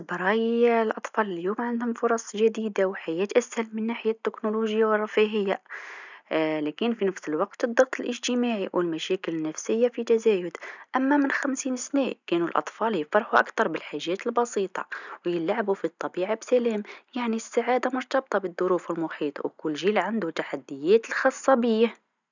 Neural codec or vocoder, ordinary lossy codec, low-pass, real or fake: none; none; 7.2 kHz; real